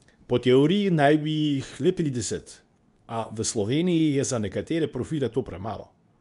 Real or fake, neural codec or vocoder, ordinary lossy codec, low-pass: fake; codec, 24 kHz, 0.9 kbps, WavTokenizer, medium speech release version 2; none; 10.8 kHz